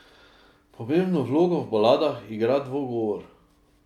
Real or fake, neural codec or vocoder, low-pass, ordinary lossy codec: real; none; 19.8 kHz; MP3, 96 kbps